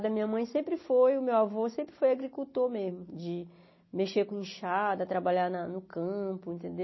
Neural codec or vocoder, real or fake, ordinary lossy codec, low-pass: none; real; MP3, 24 kbps; 7.2 kHz